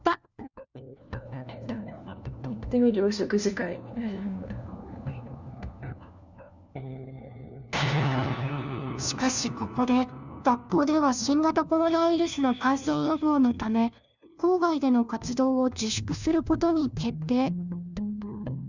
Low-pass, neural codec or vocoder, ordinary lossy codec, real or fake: 7.2 kHz; codec, 16 kHz, 1 kbps, FunCodec, trained on LibriTTS, 50 frames a second; none; fake